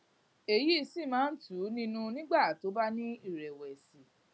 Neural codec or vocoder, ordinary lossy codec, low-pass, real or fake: none; none; none; real